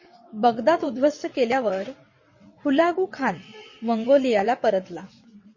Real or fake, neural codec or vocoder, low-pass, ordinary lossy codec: fake; vocoder, 22.05 kHz, 80 mel bands, WaveNeXt; 7.2 kHz; MP3, 32 kbps